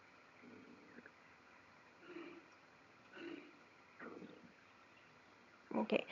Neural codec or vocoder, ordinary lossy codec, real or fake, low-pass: vocoder, 22.05 kHz, 80 mel bands, HiFi-GAN; none; fake; 7.2 kHz